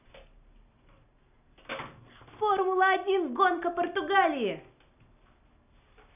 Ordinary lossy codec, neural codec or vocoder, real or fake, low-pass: none; none; real; 3.6 kHz